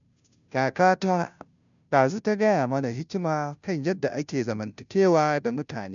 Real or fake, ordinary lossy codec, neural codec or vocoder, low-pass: fake; none; codec, 16 kHz, 0.5 kbps, FunCodec, trained on Chinese and English, 25 frames a second; 7.2 kHz